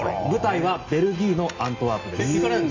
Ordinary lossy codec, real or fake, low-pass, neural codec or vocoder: AAC, 48 kbps; real; 7.2 kHz; none